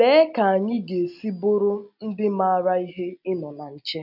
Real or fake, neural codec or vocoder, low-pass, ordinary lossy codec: real; none; 5.4 kHz; none